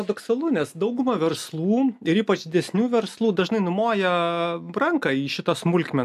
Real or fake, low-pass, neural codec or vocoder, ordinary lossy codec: real; 14.4 kHz; none; AAC, 96 kbps